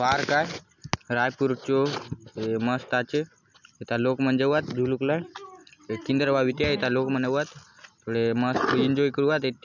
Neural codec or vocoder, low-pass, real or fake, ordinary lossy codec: none; 7.2 kHz; real; none